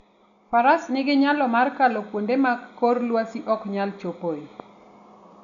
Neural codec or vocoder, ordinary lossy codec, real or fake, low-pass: none; none; real; 7.2 kHz